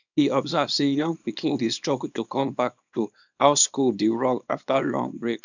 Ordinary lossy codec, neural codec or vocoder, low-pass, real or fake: none; codec, 24 kHz, 0.9 kbps, WavTokenizer, small release; 7.2 kHz; fake